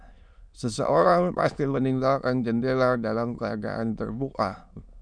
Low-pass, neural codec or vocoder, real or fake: 9.9 kHz; autoencoder, 22.05 kHz, a latent of 192 numbers a frame, VITS, trained on many speakers; fake